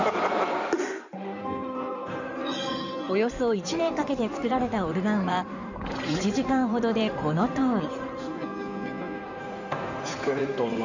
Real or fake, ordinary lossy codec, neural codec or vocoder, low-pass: fake; none; codec, 16 kHz in and 24 kHz out, 2.2 kbps, FireRedTTS-2 codec; 7.2 kHz